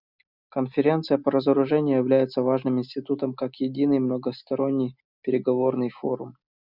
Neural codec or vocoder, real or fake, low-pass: none; real; 5.4 kHz